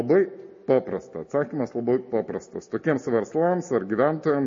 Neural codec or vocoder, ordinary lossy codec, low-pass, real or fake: none; MP3, 32 kbps; 7.2 kHz; real